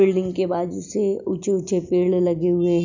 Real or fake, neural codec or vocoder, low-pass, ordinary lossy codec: real; none; 7.2 kHz; none